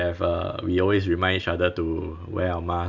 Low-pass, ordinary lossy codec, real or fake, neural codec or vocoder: 7.2 kHz; none; real; none